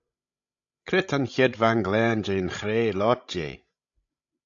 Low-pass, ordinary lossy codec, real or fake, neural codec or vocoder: 7.2 kHz; AAC, 64 kbps; fake; codec, 16 kHz, 16 kbps, FreqCodec, larger model